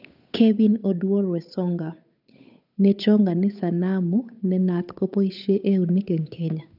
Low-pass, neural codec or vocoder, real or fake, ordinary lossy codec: 5.4 kHz; codec, 16 kHz, 8 kbps, FunCodec, trained on Chinese and English, 25 frames a second; fake; none